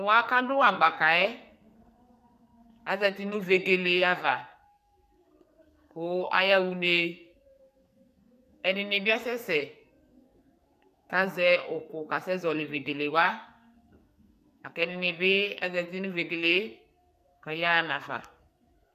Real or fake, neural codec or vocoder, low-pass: fake; codec, 44.1 kHz, 2.6 kbps, SNAC; 14.4 kHz